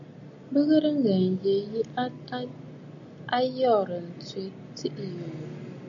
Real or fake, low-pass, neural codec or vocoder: real; 7.2 kHz; none